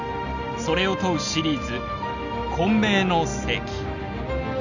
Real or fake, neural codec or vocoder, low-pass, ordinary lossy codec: real; none; 7.2 kHz; none